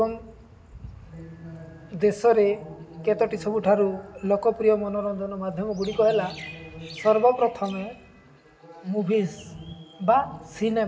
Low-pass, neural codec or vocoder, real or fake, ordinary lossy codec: none; none; real; none